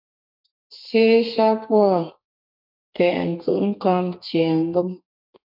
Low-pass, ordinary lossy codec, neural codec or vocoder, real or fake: 5.4 kHz; MP3, 48 kbps; codec, 32 kHz, 1.9 kbps, SNAC; fake